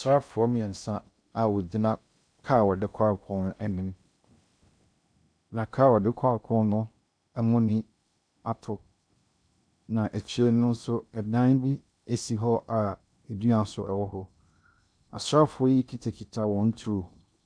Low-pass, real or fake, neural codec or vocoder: 9.9 kHz; fake; codec, 16 kHz in and 24 kHz out, 0.6 kbps, FocalCodec, streaming, 2048 codes